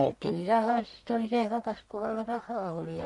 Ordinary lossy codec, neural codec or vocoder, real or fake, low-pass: none; codec, 44.1 kHz, 1.7 kbps, Pupu-Codec; fake; 10.8 kHz